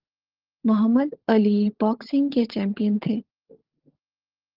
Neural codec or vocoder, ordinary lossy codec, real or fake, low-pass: codec, 16 kHz, 16 kbps, FunCodec, trained on LibriTTS, 50 frames a second; Opus, 16 kbps; fake; 5.4 kHz